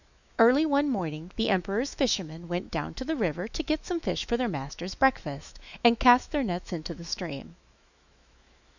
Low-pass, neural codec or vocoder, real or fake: 7.2 kHz; autoencoder, 48 kHz, 128 numbers a frame, DAC-VAE, trained on Japanese speech; fake